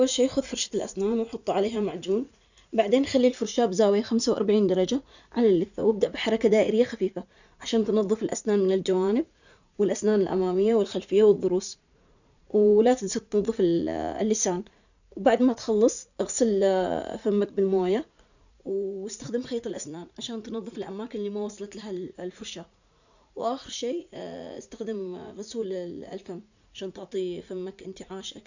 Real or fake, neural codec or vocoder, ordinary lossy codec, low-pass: fake; vocoder, 44.1 kHz, 80 mel bands, Vocos; none; 7.2 kHz